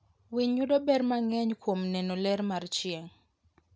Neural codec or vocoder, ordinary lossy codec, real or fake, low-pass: none; none; real; none